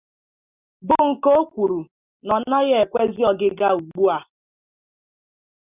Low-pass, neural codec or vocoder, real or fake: 3.6 kHz; none; real